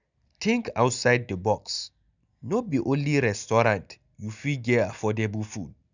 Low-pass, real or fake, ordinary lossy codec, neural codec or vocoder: 7.2 kHz; real; none; none